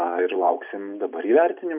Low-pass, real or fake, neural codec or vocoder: 3.6 kHz; real; none